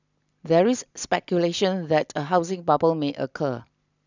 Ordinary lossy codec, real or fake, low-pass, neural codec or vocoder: none; real; 7.2 kHz; none